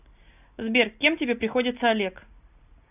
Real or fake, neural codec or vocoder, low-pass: real; none; 3.6 kHz